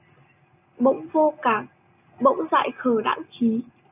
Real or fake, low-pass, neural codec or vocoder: real; 3.6 kHz; none